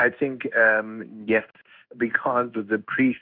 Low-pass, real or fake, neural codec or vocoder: 5.4 kHz; fake; codec, 16 kHz in and 24 kHz out, 1 kbps, XY-Tokenizer